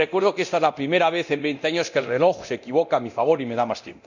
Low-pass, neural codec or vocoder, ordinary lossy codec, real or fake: 7.2 kHz; codec, 24 kHz, 0.9 kbps, DualCodec; none; fake